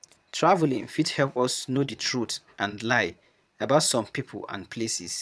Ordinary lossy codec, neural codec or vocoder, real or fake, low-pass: none; vocoder, 22.05 kHz, 80 mel bands, Vocos; fake; none